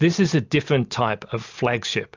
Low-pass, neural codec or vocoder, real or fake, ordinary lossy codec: 7.2 kHz; none; real; MP3, 64 kbps